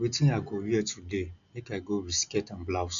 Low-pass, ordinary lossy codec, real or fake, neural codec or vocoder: 7.2 kHz; none; real; none